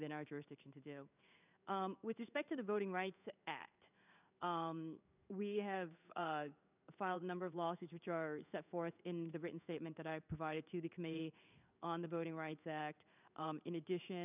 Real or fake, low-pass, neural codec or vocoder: fake; 3.6 kHz; codec, 16 kHz in and 24 kHz out, 1 kbps, XY-Tokenizer